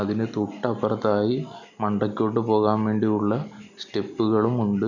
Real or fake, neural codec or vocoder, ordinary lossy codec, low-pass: real; none; none; 7.2 kHz